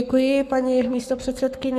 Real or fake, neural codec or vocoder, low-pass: fake; codec, 44.1 kHz, 7.8 kbps, Pupu-Codec; 14.4 kHz